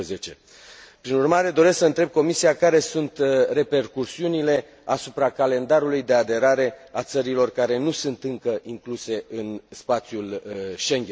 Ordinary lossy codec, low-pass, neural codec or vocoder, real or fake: none; none; none; real